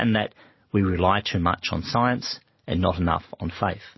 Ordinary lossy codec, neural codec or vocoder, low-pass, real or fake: MP3, 24 kbps; none; 7.2 kHz; real